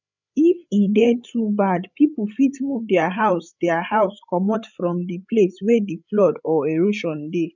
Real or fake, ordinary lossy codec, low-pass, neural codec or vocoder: fake; none; 7.2 kHz; codec, 16 kHz, 8 kbps, FreqCodec, larger model